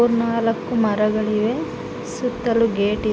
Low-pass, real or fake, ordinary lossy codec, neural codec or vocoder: none; real; none; none